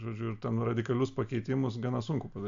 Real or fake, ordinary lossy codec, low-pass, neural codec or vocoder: real; AAC, 64 kbps; 7.2 kHz; none